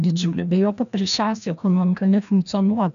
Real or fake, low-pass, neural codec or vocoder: fake; 7.2 kHz; codec, 16 kHz, 1 kbps, FreqCodec, larger model